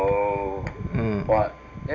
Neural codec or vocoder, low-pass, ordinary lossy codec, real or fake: none; 7.2 kHz; none; real